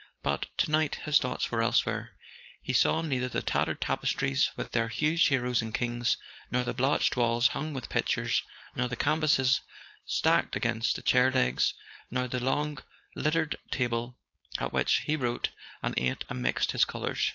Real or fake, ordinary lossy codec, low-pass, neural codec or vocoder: fake; AAC, 48 kbps; 7.2 kHz; codec, 16 kHz, 4.8 kbps, FACodec